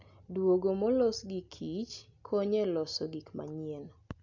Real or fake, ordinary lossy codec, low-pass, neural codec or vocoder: real; none; 7.2 kHz; none